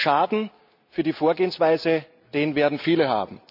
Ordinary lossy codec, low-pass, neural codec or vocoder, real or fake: none; 5.4 kHz; none; real